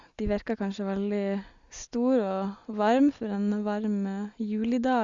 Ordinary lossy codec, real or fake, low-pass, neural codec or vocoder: Opus, 64 kbps; real; 7.2 kHz; none